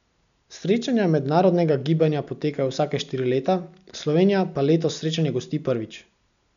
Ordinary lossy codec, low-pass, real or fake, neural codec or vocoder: none; 7.2 kHz; real; none